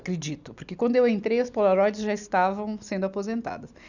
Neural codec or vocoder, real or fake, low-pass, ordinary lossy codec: none; real; 7.2 kHz; none